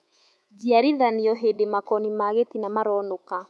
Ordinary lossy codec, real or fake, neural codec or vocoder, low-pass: none; fake; codec, 24 kHz, 3.1 kbps, DualCodec; none